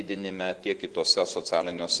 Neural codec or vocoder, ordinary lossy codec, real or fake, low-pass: vocoder, 24 kHz, 100 mel bands, Vocos; Opus, 16 kbps; fake; 10.8 kHz